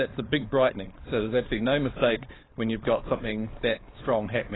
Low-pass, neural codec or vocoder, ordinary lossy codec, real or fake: 7.2 kHz; codec, 16 kHz, 4.8 kbps, FACodec; AAC, 16 kbps; fake